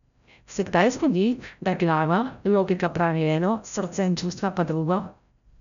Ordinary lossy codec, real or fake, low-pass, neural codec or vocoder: none; fake; 7.2 kHz; codec, 16 kHz, 0.5 kbps, FreqCodec, larger model